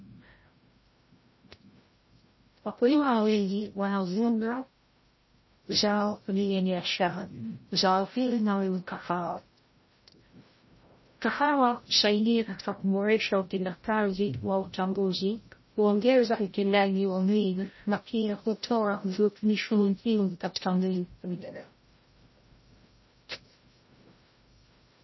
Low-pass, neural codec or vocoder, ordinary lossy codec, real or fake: 7.2 kHz; codec, 16 kHz, 0.5 kbps, FreqCodec, larger model; MP3, 24 kbps; fake